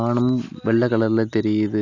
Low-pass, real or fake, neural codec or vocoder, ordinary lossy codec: 7.2 kHz; real; none; none